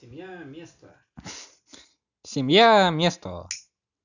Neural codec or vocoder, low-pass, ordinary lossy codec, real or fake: none; 7.2 kHz; none; real